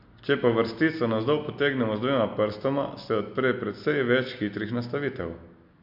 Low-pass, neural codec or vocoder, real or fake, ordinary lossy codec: 5.4 kHz; none; real; MP3, 48 kbps